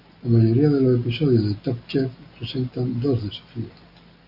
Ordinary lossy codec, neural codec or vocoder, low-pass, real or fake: AAC, 48 kbps; none; 5.4 kHz; real